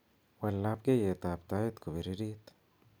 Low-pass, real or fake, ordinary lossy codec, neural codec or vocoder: none; real; none; none